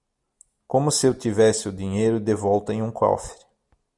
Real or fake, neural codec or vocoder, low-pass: real; none; 10.8 kHz